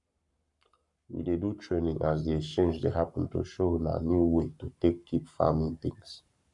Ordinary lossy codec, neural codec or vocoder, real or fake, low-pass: none; codec, 44.1 kHz, 7.8 kbps, Pupu-Codec; fake; 10.8 kHz